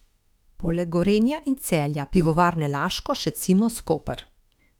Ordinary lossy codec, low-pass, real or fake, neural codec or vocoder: none; 19.8 kHz; fake; autoencoder, 48 kHz, 32 numbers a frame, DAC-VAE, trained on Japanese speech